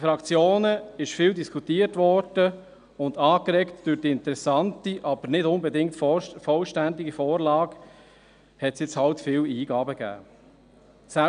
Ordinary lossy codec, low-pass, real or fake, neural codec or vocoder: none; 9.9 kHz; real; none